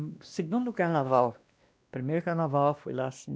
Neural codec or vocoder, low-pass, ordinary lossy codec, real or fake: codec, 16 kHz, 1 kbps, X-Codec, WavLM features, trained on Multilingual LibriSpeech; none; none; fake